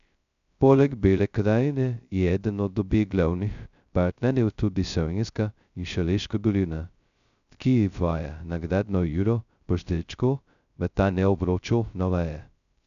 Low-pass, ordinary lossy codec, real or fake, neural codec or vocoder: 7.2 kHz; none; fake; codec, 16 kHz, 0.2 kbps, FocalCodec